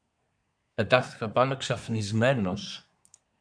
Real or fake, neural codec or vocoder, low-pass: fake; codec, 24 kHz, 1 kbps, SNAC; 9.9 kHz